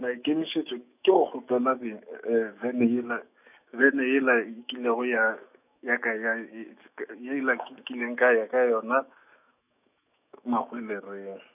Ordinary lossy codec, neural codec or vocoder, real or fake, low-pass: none; autoencoder, 48 kHz, 128 numbers a frame, DAC-VAE, trained on Japanese speech; fake; 3.6 kHz